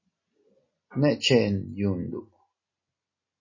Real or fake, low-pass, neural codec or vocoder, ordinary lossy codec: real; 7.2 kHz; none; MP3, 32 kbps